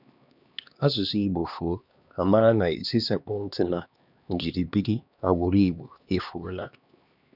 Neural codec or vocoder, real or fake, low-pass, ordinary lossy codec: codec, 16 kHz, 2 kbps, X-Codec, HuBERT features, trained on LibriSpeech; fake; 5.4 kHz; none